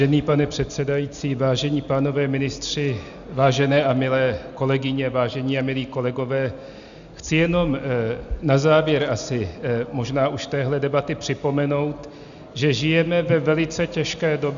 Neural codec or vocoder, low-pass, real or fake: none; 7.2 kHz; real